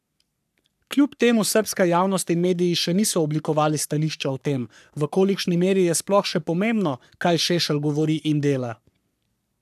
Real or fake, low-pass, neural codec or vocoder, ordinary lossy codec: fake; 14.4 kHz; codec, 44.1 kHz, 3.4 kbps, Pupu-Codec; none